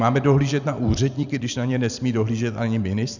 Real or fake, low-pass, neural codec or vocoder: fake; 7.2 kHz; vocoder, 44.1 kHz, 128 mel bands every 256 samples, BigVGAN v2